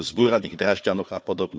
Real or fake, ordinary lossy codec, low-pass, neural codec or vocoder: fake; none; none; codec, 16 kHz, 4 kbps, FunCodec, trained on Chinese and English, 50 frames a second